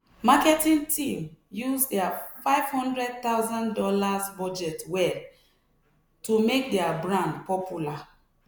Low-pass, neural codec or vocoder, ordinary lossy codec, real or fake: none; none; none; real